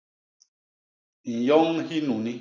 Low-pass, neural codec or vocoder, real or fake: 7.2 kHz; none; real